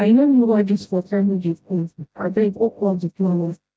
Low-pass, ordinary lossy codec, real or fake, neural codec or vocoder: none; none; fake; codec, 16 kHz, 0.5 kbps, FreqCodec, smaller model